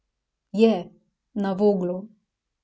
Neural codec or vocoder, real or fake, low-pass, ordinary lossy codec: none; real; none; none